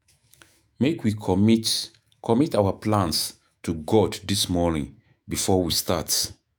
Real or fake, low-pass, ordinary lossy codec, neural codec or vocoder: fake; none; none; autoencoder, 48 kHz, 128 numbers a frame, DAC-VAE, trained on Japanese speech